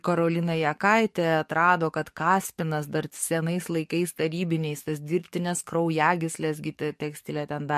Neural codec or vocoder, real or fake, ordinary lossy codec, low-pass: codec, 44.1 kHz, 7.8 kbps, DAC; fake; MP3, 64 kbps; 14.4 kHz